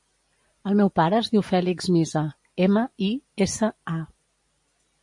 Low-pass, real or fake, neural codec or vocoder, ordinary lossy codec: 10.8 kHz; real; none; MP3, 48 kbps